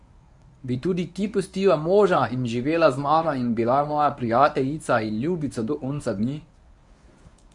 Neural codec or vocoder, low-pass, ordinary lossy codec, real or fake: codec, 24 kHz, 0.9 kbps, WavTokenizer, medium speech release version 1; 10.8 kHz; none; fake